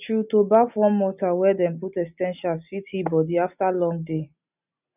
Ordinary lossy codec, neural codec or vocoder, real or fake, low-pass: none; none; real; 3.6 kHz